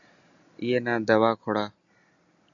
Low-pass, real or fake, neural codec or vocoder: 7.2 kHz; real; none